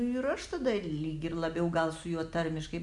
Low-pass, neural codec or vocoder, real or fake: 10.8 kHz; none; real